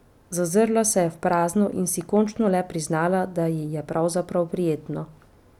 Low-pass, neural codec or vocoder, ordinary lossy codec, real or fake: 19.8 kHz; none; none; real